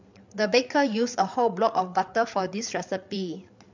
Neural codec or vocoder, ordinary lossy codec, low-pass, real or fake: codec, 16 kHz, 8 kbps, FreqCodec, larger model; MP3, 64 kbps; 7.2 kHz; fake